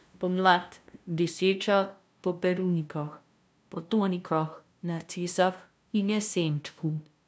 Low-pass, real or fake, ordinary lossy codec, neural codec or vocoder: none; fake; none; codec, 16 kHz, 0.5 kbps, FunCodec, trained on LibriTTS, 25 frames a second